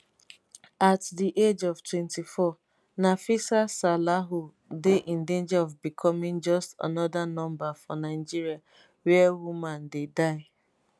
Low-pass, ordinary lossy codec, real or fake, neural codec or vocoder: none; none; real; none